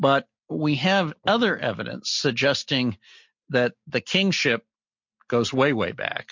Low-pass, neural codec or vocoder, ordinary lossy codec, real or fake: 7.2 kHz; none; MP3, 48 kbps; real